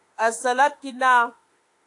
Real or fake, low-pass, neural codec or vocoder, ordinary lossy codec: fake; 10.8 kHz; autoencoder, 48 kHz, 32 numbers a frame, DAC-VAE, trained on Japanese speech; AAC, 48 kbps